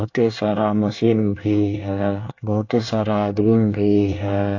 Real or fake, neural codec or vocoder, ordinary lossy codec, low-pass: fake; codec, 24 kHz, 1 kbps, SNAC; AAC, 48 kbps; 7.2 kHz